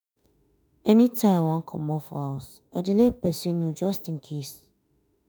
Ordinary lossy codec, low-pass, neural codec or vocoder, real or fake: none; none; autoencoder, 48 kHz, 32 numbers a frame, DAC-VAE, trained on Japanese speech; fake